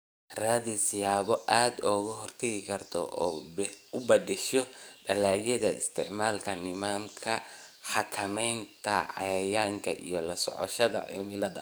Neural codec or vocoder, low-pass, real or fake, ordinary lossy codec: codec, 44.1 kHz, 7.8 kbps, DAC; none; fake; none